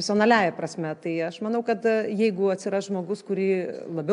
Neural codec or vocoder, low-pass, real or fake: none; 10.8 kHz; real